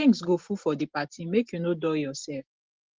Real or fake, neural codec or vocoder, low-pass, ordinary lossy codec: real; none; 7.2 kHz; Opus, 16 kbps